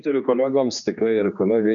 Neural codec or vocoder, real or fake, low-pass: codec, 16 kHz, 2 kbps, X-Codec, HuBERT features, trained on general audio; fake; 7.2 kHz